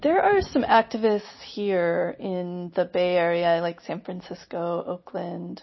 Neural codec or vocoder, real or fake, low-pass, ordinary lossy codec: none; real; 7.2 kHz; MP3, 24 kbps